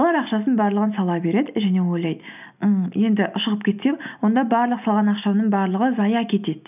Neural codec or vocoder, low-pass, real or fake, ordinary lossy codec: none; 3.6 kHz; real; none